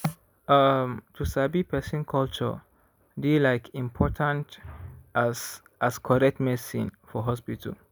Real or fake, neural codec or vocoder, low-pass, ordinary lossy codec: real; none; none; none